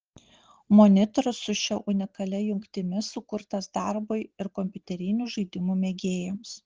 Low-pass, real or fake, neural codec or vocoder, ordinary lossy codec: 7.2 kHz; real; none; Opus, 16 kbps